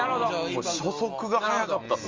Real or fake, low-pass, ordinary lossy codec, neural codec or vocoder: real; 7.2 kHz; Opus, 32 kbps; none